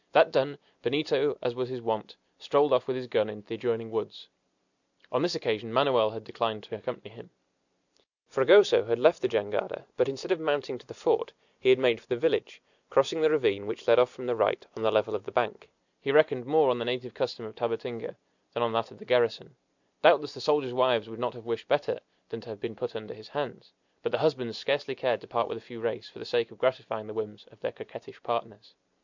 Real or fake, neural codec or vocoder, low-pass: real; none; 7.2 kHz